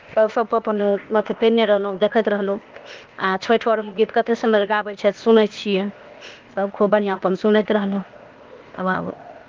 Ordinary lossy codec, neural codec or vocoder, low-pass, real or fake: Opus, 32 kbps; codec, 16 kHz, 0.8 kbps, ZipCodec; 7.2 kHz; fake